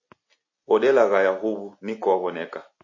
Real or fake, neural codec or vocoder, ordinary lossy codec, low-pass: real; none; MP3, 32 kbps; 7.2 kHz